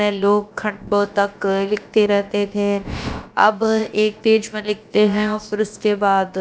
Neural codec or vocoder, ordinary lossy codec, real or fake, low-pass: codec, 16 kHz, about 1 kbps, DyCAST, with the encoder's durations; none; fake; none